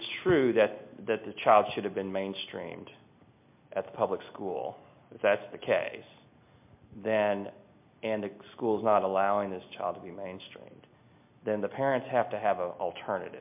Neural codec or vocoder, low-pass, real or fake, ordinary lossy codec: none; 3.6 kHz; real; MP3, 32 kbps